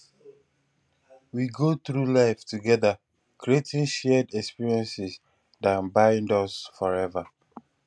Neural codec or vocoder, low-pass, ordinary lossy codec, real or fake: none; none; none; real